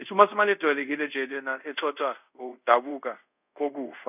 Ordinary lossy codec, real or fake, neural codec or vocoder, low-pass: none; fake; codec, 24 kHz, 0.5 kbps, DualCodec; 3.6 kHz